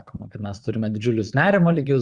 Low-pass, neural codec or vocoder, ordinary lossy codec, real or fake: 9.9 kHz; vocoder, 22.05 kHz, 80 mel bands, Vocos; Opus, 64 kbps; fake